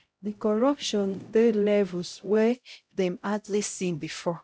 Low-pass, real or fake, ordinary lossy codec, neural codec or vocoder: none; fake; none; codec, 16 kHz, 0.5 kbps, X-Codec, HuBERT features, trained on LibriSpeech